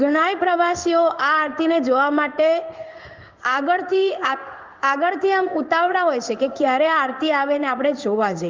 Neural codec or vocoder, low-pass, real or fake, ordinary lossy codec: codec, 16 kHz in and 24 kHz out, 1 kbps, XY-Tokenizer; 7.2 kHz; fake; Opus, 32 kbps